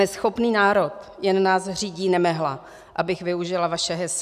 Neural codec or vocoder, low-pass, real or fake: none; 14.4 kHz; real